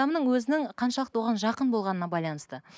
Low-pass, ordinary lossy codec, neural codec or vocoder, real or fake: none; none; none; real